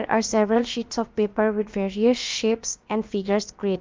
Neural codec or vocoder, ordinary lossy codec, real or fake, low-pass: codec, 16 kHz, about 1 kbps, DyCAST, with the encoder's durations; Opus, 24 kbps; fake; 7.2 kHz